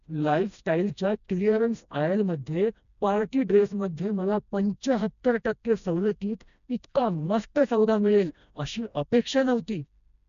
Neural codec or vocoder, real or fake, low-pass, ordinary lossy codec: codec, 16 kHz, 1 kbps, FreqCodec, smaller model; fake; 7.2 kHz; none